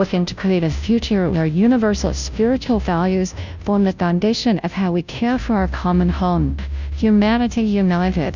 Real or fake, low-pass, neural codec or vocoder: fake; 7.2 kHz; codec, 16 kHz, 0.5 kbps, FunCodec, trained on Chinese and English, 25 frames a second